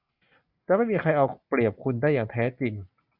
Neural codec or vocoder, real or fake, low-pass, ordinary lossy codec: none; real; 5.4 kHz; Opus, 64 kbps